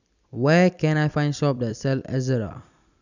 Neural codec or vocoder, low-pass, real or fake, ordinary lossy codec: vocoder, 44.1 kHz, 128 mel bands every 256 samples, BigVGAN v2; 7.2 kHz; fake; none